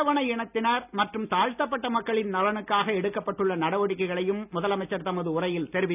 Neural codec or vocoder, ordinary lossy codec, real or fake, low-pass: none; none; real; 3.6 kHz